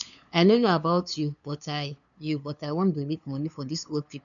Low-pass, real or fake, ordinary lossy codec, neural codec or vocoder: 7.2 kHz; fake; none; codec, 16 kHz, 2 kbps, FunCodec, trained on LibriTTS, 25 frames a second